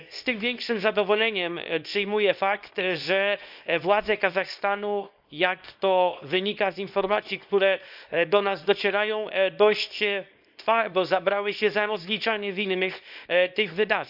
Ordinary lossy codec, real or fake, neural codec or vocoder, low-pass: none; fake; codec, 24 kHz, 0.9 kbps, WavTokenizer, small release; 5.4 kHz